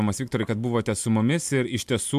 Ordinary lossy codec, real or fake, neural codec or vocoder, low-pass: MP3, 96 kbps; real; none; 14.4 kHz